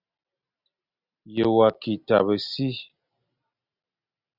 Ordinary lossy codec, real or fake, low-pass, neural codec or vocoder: Opus, 64 kbps; real; 5.4 kHz; none